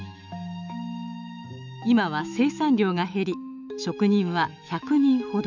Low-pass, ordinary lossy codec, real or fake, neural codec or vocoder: 7.2 kHz; none; fake; autoencoder, 48 kHz, 128 numbers a frame, DAC-VAE, trained on Japanese speech